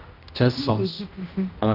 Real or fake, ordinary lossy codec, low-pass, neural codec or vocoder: fake; Opus, 24 kbps; 5.4 kHz; codec, 16 kHz, 1 kbps, X-Codec, HuBERT features, trained on general audio